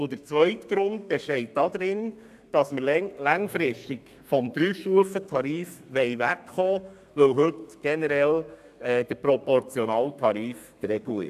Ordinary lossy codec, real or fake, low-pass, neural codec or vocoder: AAC, 96 kbps; fake; 14.4 kHz; codec, 32 kHz, 1.9 kbps, SNAC